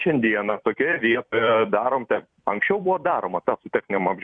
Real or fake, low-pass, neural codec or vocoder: fake; 9.9 kHz; vocoder, 44.1 kHz, 128 mel bands every 256 samples, BigVGAN v2